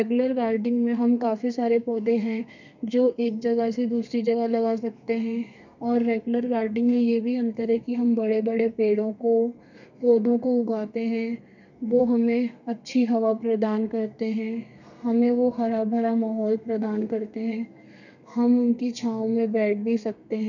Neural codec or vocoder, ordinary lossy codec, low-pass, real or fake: codec, 32 kHz, 1.9 kbps, SNAC; none; 7.2 kHz; fake